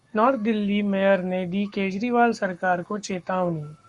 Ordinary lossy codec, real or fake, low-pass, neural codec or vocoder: Opus, 64 kbps; fake; 10.8 kHz; codec, 44.1 kHz, 7.8 kbps, Pupu-Codec